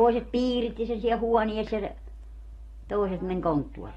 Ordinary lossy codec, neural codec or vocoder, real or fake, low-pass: AAC, 32 kbps; none; real; 19.8 kHz